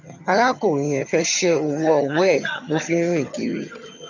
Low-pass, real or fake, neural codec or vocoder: 7.2 kHz; fake; vocoder, 22.05 kHz, 80 mel bands, HiFi-GAN